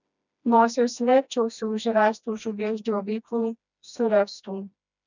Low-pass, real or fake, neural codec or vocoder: 7.2 kHz; fake; codec, 16 kHz, 1 kbps, FreqCodec, smaller model